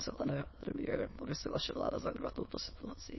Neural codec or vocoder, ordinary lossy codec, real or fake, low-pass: autoencoder, 22.05 kHz, a latent of 192 numbers a frame, VITS, trained on many speakers; MP3, 24 kbps; fake; 7.2 kHz